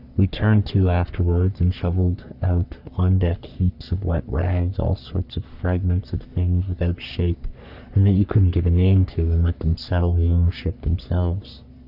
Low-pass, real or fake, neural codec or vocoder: 5.4 kHz; fake; codec, 44.1 kHz, 3.4 kbps, Pupu-Codec